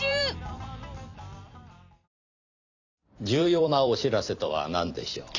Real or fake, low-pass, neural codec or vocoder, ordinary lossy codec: fake; 7.2 kHz; vocoder, 44.1 kHz, 128 mel bands every 256 samples, BigVGAN v2; none